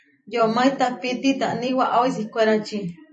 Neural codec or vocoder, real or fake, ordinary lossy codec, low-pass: vocoder, 44.1 kHz, 128 mel bands every 256 samples, BigVGAN v2; fake; MP3, 32 kbps; 10.8 kHz